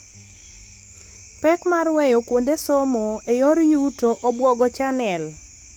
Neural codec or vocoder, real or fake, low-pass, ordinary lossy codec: codec, 44.1 kHz, 7.8 kbps, DAC; fake; none; none